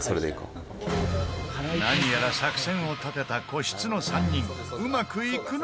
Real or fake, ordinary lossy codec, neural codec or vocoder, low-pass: real; none; none; none